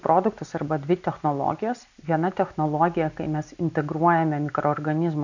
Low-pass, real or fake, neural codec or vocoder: 7.2 kHz; real; none